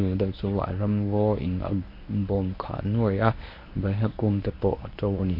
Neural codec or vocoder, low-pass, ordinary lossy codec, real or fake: codec, 24 kHz, 0.9 kbps, WavTokenizer, medium speech release version 1; 5.4 kHz; MP3, 32 kbps; fake